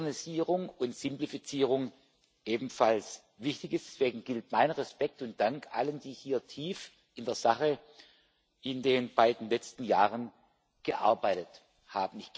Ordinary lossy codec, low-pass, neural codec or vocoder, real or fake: none; none; none; real